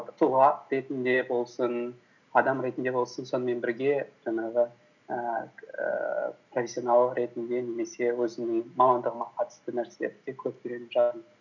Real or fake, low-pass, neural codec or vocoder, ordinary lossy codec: real; 7.2 kHz; none; none